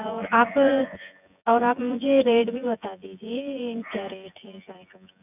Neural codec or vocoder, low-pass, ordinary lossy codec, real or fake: vocoder, 24 kHz, 100 mel bands, Vocos; 3.6 kHz; none; fake